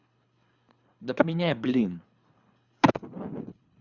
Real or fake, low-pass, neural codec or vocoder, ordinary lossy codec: fake; 7.2 kHz; codec, 24 kHz, 3 kbps, HILCodec; Opus, 64 kbps